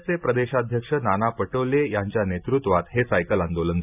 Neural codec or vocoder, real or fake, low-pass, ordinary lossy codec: none; real; 3.6 kHz; none